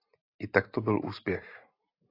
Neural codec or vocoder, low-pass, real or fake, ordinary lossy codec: none; 5.4 kHz; real; AAC, 32 kbps